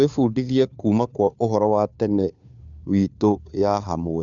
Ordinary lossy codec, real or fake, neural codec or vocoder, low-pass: MP3, 96 kbps; fake; codec, 16 kHz, 2 kbps, FunCodec, trained on Chinese and English, 25 frames a second; 7.2 kHz